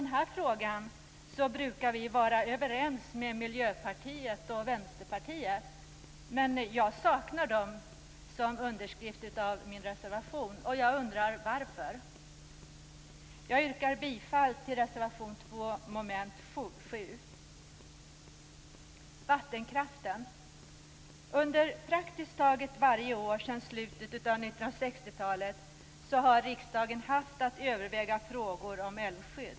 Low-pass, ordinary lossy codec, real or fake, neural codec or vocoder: none; none; real; none